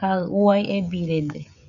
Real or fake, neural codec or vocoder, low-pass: fake; codec, 16 kHz, 16 kbps, FreqCodec, smaller model; 7.2 kHz